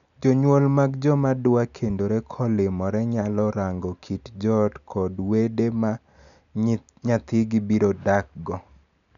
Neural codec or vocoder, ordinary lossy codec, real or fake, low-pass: none; none; real; 7.2 kHz